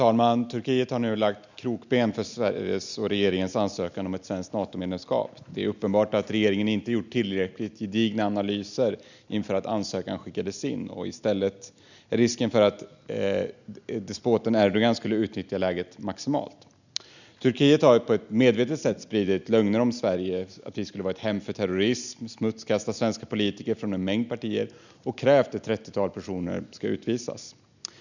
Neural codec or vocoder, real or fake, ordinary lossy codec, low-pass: none; real; none; 7.2 kHz